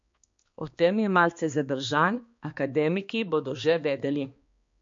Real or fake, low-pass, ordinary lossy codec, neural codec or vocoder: fake; 7.2 kHz; MP3, 48 kbps; codec, 16 kHz, 2 kbps, X-Codec, HuBERT features, trained on balanced general audio